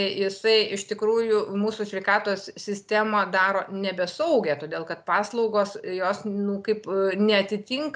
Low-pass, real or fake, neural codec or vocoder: 9.9 kHz; real; none